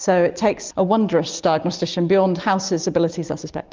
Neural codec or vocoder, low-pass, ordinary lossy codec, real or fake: none; 7.2 kHz; Opus, 32 kbps; real